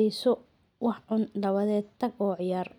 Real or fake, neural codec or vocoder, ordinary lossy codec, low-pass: real; none; none; 19.8 kHz